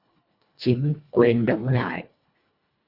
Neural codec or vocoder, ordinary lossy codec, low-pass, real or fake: codec, 24 kHz, 1.5 kbps, HILCodec; Opus, 64 kbps; 5.4 kHz; fake